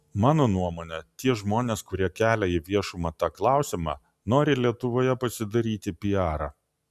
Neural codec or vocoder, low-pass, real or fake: none; 14.4 kHz; real